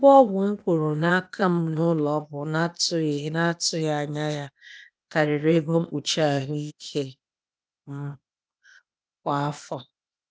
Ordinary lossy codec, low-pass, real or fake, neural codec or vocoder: none; none; fake; codec, 16 kHz, 0.8 kbps, ZipCodec